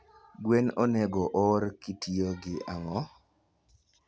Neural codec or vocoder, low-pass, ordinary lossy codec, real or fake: none; none; none; real